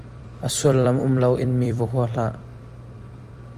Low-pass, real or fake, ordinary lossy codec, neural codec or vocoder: 14.4 kHz; fake; Opus, 32 kbps; vocoder, 44.1 kHz, 128 mel bands every 256 samples, BigVGAN v2